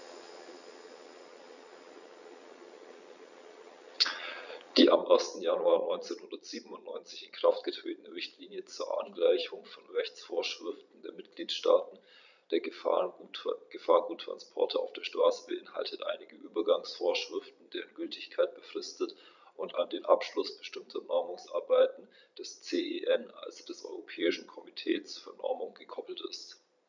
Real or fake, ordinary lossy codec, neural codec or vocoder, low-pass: fake; none; vocoder, 22.05 kHz, 80 mel bands, Vocos; 7.2 kHz